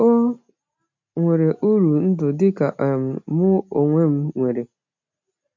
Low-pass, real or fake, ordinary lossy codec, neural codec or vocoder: 7.2 kHz; real; MP3, 64 kbps; none